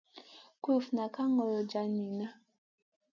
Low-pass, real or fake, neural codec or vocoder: 7.2 kHz; real; none